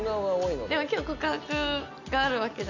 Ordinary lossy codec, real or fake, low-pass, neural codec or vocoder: none; real; 7.2 kHz; none